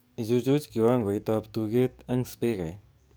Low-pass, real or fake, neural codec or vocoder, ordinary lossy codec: none; fake; codec, 44.1 kHz, 7.8 kbps, DAC; none